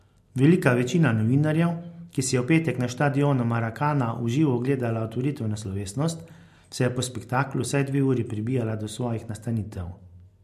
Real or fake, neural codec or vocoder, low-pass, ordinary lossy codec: real; none; 14.4 kHz; MP3, 64 kbps